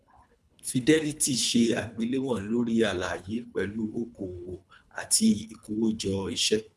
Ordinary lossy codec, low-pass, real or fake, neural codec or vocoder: none; none; fake; codec, 24 kHz, 3 kbps, HILCodec